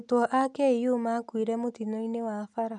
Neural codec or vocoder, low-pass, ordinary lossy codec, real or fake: none; 10.8 kHz; none; real